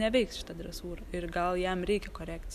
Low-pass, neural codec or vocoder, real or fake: 14.4 kHz; none; real